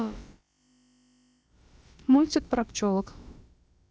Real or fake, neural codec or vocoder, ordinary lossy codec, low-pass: fake; codec, 16 kHz, about 1 kbps, DyCAST, with the encoder's durations; none; none